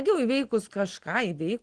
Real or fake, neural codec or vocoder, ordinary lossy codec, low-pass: real; none; Opus, 16 kbps; 9.9 kHz